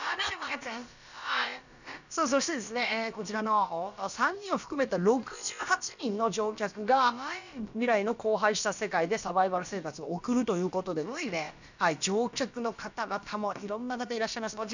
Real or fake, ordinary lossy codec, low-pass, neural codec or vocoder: fake; none; 7.2 kHz; codec, 16 kHz, about 1 kbps, DyCAST, with the encoder's durations